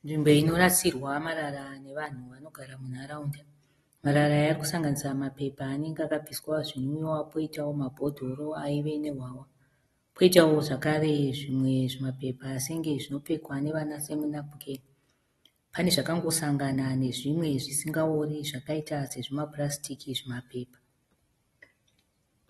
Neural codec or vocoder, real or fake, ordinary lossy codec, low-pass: none; real; AAC, 32 kbps; 19.8 kHz